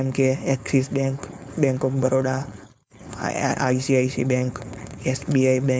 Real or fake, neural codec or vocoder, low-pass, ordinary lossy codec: fake; codec, 16 kHz, 4.8 kbps, FACodec; none; none